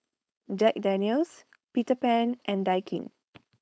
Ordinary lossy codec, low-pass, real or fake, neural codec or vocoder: none; none; fake; codec, 16 kHz, 4.8 kbps, FACodec